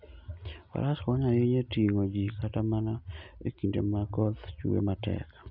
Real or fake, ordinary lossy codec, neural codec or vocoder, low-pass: real; none; none; 5.4 kHz